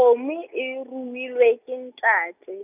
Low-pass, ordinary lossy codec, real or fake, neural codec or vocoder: 3.6 kHz; none; real; none